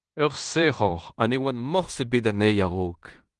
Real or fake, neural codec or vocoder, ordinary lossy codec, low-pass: fake; codec, 16 kHz in and 24 kHz out, 0.9 kbps, LongCat-Audio-Codec, fine tuned four codebook decoder; Opus, 24 kbps; 10.8 kHz